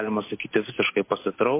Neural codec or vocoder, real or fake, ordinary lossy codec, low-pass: none; real; MP3, 24 kbps; 3.6 kHz